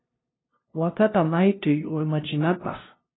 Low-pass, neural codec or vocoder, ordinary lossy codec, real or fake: 7.2 kHz; codec, 16 kHz, 0.5 kbps, FunCodec, trained on LibriTTS, 25 frames a second; AAC, 16 kbps; fake